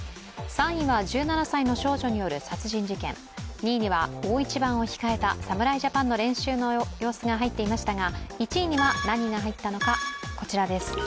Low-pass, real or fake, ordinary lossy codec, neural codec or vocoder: none; real; none; none